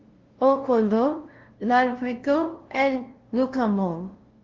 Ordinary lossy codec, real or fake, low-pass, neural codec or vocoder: Opus, 16 kbps; fake; 7.2 kHz; codec, 16 kHz, 0.5 kbps, FunCodec, trained on LibriTTS, 25 frames a second